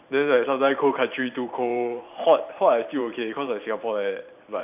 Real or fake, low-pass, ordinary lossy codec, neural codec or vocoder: fake; 3.6 kHz; none; vocoder, 44.1 kHz, 128 mel bands every 512 samples, BigVGAN v2